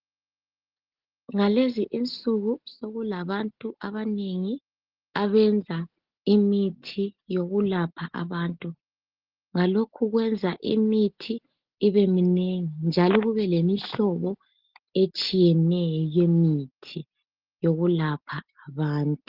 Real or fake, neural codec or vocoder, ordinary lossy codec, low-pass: real; none; Opus, 16 kbps; 5.4 kHz